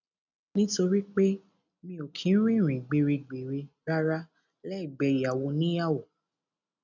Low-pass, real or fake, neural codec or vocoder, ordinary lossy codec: 7.2 kHz; real; none; none